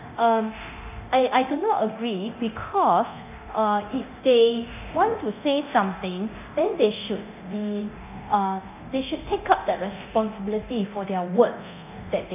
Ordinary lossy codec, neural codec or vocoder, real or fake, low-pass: none; codec, 24 kHz, 0.9 kbps, DualCodec; fake; 3.6 kHz